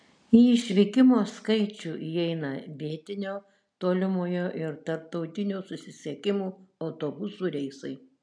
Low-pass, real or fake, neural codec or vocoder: 9.9 kHz; real; none